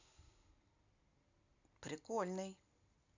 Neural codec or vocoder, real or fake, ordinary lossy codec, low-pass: none; real; AAC, 48 kbps; 7.2 kHz